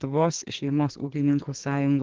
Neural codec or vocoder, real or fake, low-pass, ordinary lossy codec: codec, 44.1 kHz, 2.6 kbps, SNAC; fake; 7.2 kHz; Opus, 16 kbps